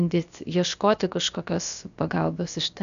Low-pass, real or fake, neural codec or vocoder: 7.2 kHz; fake; codec, 16 kHz, about 1 kbps, DyCAST, with the encoder's durations